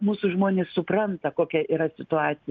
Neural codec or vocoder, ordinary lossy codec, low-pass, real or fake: none; Opus, 24 kbps; 7.2 kHz; real